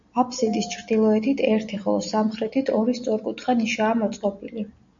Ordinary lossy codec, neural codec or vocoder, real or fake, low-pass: MP3, 64 kbps; none; real; 7.2 kHz